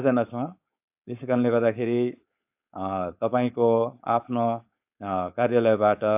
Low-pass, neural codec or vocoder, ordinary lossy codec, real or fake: 3.6 kHz; codec, 16 kHz, 4.8 kbps, FACodec; AAC, 32 kbps; fake